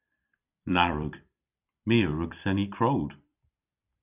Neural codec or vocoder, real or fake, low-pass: vocoder, 44.1 kHz, 128 mel bands every 256 samples, BigVGAN v2; fake; 3.6 kHz